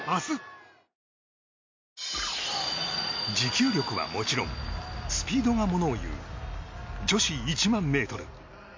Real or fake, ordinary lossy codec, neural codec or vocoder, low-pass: real; MP3, 48 kbps; none; 7.2 kHz